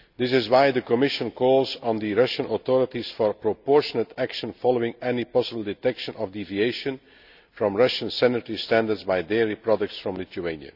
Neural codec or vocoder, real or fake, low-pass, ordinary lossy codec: none; real; 5.4 kHz; none